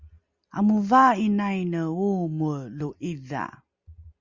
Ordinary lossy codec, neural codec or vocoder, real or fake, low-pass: Opus, 64 kbps; none; real; 7.2 kHz